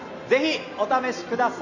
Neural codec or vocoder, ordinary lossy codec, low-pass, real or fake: none; none; 7.2 kHz; real